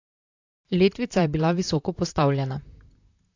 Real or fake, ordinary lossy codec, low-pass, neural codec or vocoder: fake; AAC, 48 kbps; 7.2 kHz; vocoder, 44.1 kHz, 128 mel bands, Pupu-Vocoder